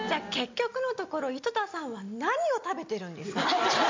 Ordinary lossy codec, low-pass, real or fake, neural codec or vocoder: MP3, 48 kbps; 7.2 kHz; real; none